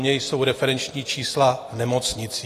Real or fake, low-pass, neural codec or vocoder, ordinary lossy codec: real; 14.4 kHz; none; AAC, 48 kbps